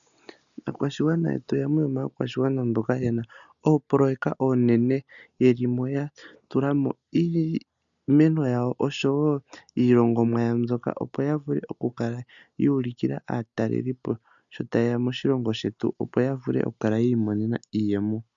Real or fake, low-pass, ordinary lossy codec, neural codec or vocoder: real; 7.2 kHz; MP3, 96 kbps; none